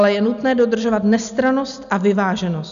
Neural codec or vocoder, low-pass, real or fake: none; 7.2 kHz; real